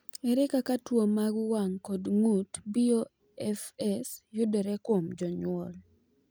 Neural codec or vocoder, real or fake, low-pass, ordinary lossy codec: none; real; none; none